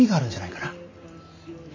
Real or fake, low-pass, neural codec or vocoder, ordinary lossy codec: real; 7.2 kHz; none; AAC, 32 kbps